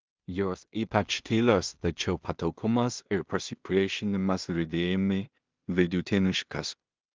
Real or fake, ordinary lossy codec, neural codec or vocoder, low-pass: fake; Opus, 24 kbps; codec, 16 kHz in and 24 kHz out, 0.4 kbps, LongCat-Audio-Codec, two codebook decoder; 7.2 kHz